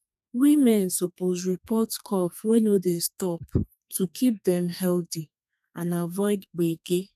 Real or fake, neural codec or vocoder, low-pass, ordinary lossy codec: fake; codec, 32 kHz, 1.9 kbps, SNAC; 14.4 kHz; none